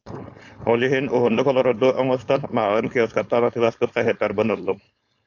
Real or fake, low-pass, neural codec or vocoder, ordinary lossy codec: fake; 7.2 kHz; codec, 16 kHz, 4.8 kbps, FACodec; AAC, 48 kbps